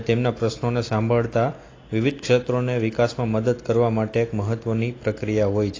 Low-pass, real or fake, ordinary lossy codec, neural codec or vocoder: 7.2 kHz; real; AAC, 32 kbps; none